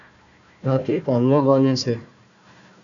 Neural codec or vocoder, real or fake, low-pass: codec, 16 kHz, 1 kbps, FunCodec, trained on Chinese and English, 50 frames a second; fake; 7.2 kHz